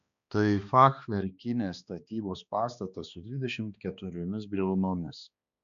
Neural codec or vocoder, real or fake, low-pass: codec, 16 kHz, 2 kbps, X-Codec, HuBERT features, trained on balanced general audio; fake; 7.2 kHz